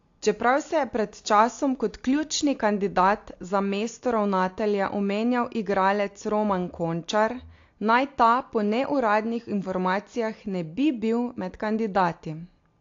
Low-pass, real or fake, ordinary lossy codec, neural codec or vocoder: 7.2 kHz; real; AAC, 48 kbps; none